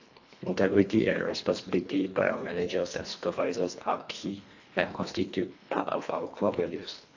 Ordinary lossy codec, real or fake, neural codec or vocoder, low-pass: AAC, 48 kbps; fake; codec, 24 kHz, 1.5 kbps, HILCodec; 7.2 kHz